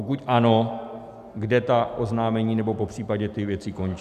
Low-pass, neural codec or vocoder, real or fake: 14.4 kHz; none; real